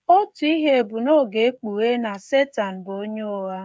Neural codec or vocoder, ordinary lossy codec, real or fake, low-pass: codec, 16 kHz, 16 kbps, FreqCodec, smaller model; none; fake; none